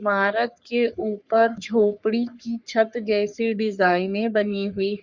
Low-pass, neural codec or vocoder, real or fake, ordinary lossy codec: 7.2 kHz; codec, 44.1 kHz, 3.4 kbps, Pupu-Codec; fake; Opus, 64 kbps